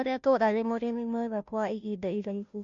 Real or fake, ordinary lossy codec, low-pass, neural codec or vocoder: fake; MP3, 64 kbps; 7.2 kHz; codec, 16 kHz, 0.5 kbps, FunCodec, trained on Chinese and English, 25 frames a second